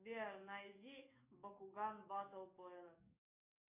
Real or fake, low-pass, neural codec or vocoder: fake; 3.6 kHz; codec, 44.1 kHz, 7.8 kbps, DAC